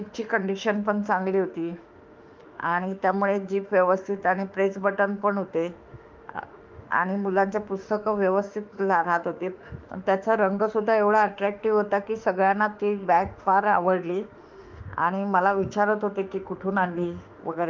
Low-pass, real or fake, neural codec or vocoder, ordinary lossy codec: 7.2 kHz; fake; autoencoder, 48 kHz, 32 numbers a frame, DAC-VAE, trained on Japanese speech; Opus, 24 kbps